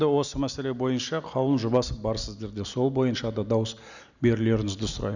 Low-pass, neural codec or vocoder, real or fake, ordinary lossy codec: 7.2 kHz; none; real; none